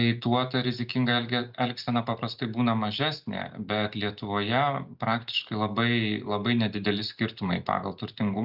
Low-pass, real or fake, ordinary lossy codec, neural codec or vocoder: 5.4 kHz; real; Opus, 64 kbps; none